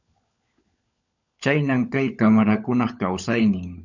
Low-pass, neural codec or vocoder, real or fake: 7.2 kHz; codec, 16 kHz, 16 kbps, FunCodec, trained on LibriTTS, 50 frames a second; fake